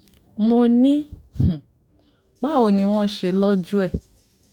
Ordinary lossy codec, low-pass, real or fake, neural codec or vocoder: none; 19.8 kHz; fake; codec, 44.1 kHz, 2.6 kbps, DAC